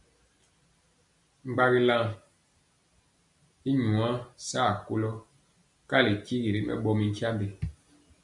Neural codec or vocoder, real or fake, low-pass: none; real; 10.8 kHz